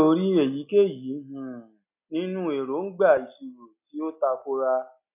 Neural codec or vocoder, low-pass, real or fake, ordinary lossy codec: none; 3.6 kHz; real; none